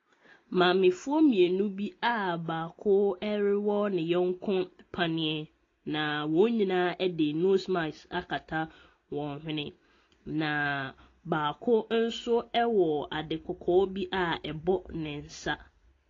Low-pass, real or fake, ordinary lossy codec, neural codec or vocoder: 7.2 kHz; real; AAC, 32 kbps; none